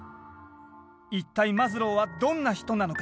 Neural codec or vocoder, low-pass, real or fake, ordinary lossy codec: none; none; real; none